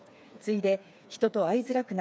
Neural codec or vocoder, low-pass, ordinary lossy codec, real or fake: codec, 16 kHz, 4 kbps, FreqCodec, smaller model; none; none; fake